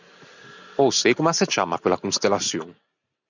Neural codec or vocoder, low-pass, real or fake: none; 7.2 kHz; real